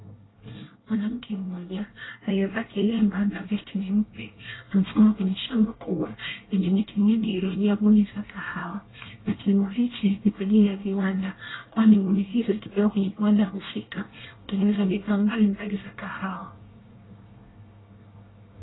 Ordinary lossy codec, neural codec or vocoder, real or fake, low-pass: AAC, 16 kbps; codec, 24 kHz, 1 kbps, SNAC; fake; 7.2 kHz